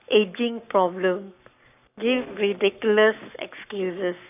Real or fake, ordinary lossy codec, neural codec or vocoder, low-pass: fake; none; codec, 44.1 kHz, 7.8 kbps, Pupu-Codec; 3.6 kHz